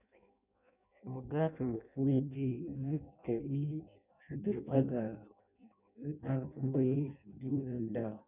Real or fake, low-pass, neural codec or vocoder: fake; 3.6 kHz; codec, 16 kHz in and 24 kHz out, 0.6 kbps, FireRedTTS-2 codec